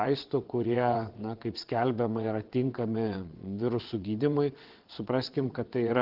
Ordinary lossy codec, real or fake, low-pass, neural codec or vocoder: Opus, 16 kbps; fake; 5.4 kHz; vocoder, 22.05 kHz, 80 mel bands, WaveNeXt